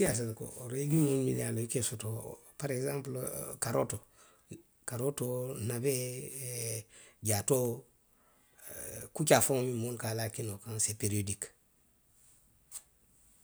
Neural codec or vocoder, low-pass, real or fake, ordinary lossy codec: none; none; real; none